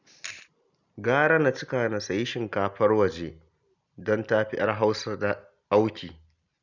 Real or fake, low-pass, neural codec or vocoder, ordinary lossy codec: real; 7.2 kHz; none; none